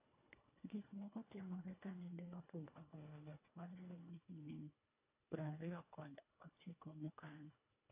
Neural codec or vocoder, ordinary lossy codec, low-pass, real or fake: codec, 24 kHz, 1.5 kbps, HILCodec; AAC, 32 kbps; 3.6 kHz; fake